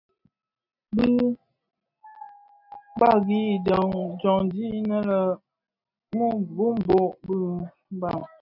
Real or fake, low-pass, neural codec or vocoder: real; 5.4 kHz; none